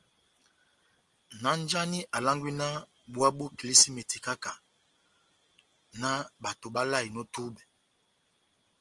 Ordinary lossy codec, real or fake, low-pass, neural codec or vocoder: Opus, 24 kbps; real; 10.8 kHz; none